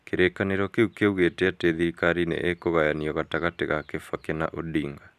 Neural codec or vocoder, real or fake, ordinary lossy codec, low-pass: none; real; none; 14.4 kHz